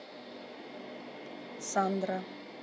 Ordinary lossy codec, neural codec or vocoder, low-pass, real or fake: none; none; none; real